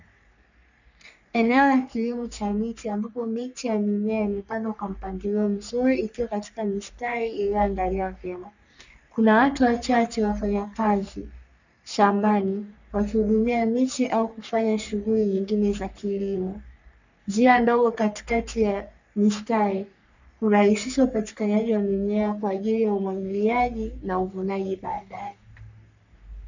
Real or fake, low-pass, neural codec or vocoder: fake; 7.2 kHz; codec, 44.1 kHz, 3.4 kbps, Pupu-Codec